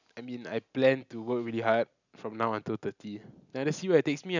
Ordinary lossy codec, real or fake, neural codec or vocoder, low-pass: none; real; none; 7.2 kHz